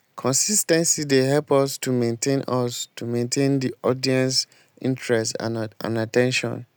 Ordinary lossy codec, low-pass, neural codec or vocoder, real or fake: none; none; none; real